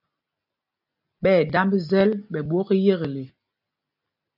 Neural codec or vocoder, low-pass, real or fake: none; 5.4 kHz; real